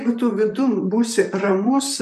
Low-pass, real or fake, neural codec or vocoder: 14.4 kHz; fake; vocoder, 44.1 kHz, 128 mel bands, Pupu-Vocoder